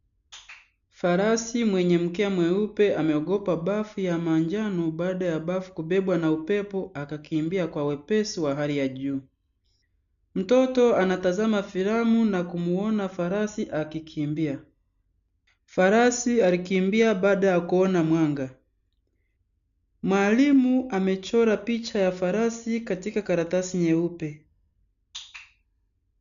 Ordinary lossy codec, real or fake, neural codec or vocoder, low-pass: none; real; none; 7.2 kHz